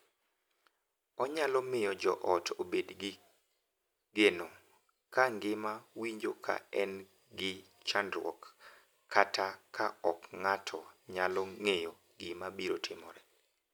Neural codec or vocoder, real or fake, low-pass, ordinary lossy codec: none; real; none; none